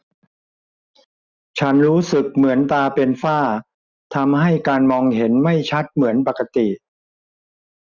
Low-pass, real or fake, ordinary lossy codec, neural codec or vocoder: 7.2 kHz; real; none; none